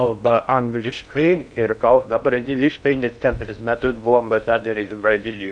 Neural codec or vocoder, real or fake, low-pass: codec, 16 kHz in and 24 kHz out, 0.6 kbps, FocalCodec, streaming, 2048 codes; fake; 9.9 kHz